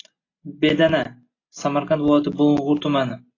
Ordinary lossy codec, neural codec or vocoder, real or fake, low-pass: AAC, 32 kbps; none; real; 7.2 kHz